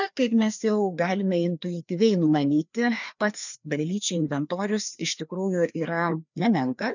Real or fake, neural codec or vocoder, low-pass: fake; codec, 16 kHz, 2 kbps, FreqCodec, larger model; 7.2 kHz